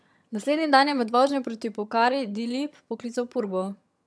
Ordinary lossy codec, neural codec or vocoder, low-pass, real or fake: none; vocoder, 22.05 kHz, 80 mel bands, HiFi-GAN; none; fake